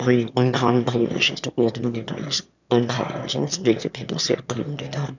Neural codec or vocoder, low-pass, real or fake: autoencoder, 22.05 kHz, a latent of 192 numbers a frame, VITS, trained on one speaker; 7.2 kHz; fake